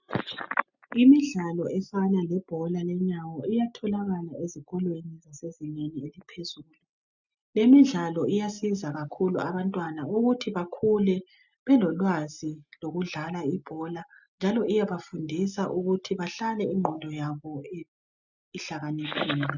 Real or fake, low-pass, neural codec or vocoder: real; 7.2 kHz; none